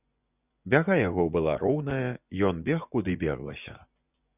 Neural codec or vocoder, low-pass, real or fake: vocoder, 24 kHz, 100 mel bands, Vocos; 3.6 kHz; fake